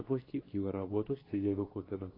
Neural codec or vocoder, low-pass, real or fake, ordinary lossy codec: codec, 24 kHz, 0.9 kbps, WavTokenizer, medium speech release version 2; 5.4 kHz; fake; AAC, 24 kbps